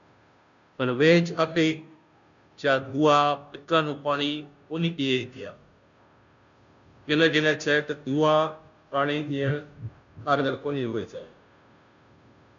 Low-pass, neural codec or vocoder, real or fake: 7.2 kHz; codec, 16 kHz, 0.5 kbps, FunCodec, trained on Chinese and English, 25 frames a second; fake